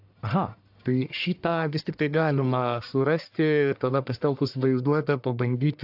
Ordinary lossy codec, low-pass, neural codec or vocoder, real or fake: AAC, 48 kbps; 5.4 kHz; codec, 44.1 kHz, 1.7 kbps, Pupu-Codec; fake